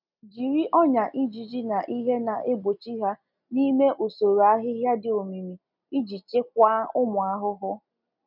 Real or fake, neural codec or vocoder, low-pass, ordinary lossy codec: real; none; 5.4 kHz; none